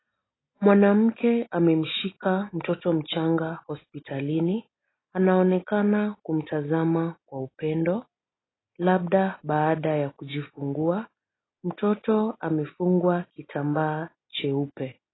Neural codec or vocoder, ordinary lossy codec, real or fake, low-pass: none; AAC, 16 kbps; real; 7.2 kHz